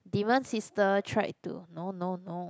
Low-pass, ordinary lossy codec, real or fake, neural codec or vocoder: none; none; real; none